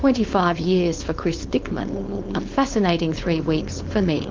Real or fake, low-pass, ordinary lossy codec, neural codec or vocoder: fake; 7.2 kHz; Opus, 24 kbps; codec, 16 kHz, 4.8 kbps, FACodec